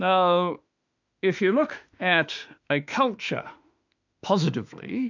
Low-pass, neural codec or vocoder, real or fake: 7.2 kHz; autoencoder, 48 kHz, 32 numbers a frame, DAC-VAE, trained on Japanese speech; fake